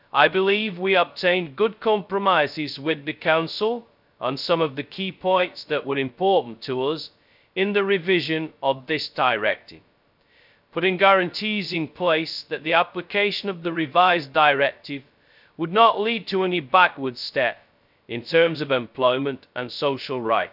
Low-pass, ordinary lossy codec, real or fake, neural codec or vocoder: 5.4 kHz; none; fake; codec, 16 kHz, 0.2 kbps, FocalCodec